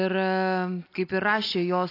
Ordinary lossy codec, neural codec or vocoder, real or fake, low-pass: AAC, 32 kbps; none; real; 5.4 kHz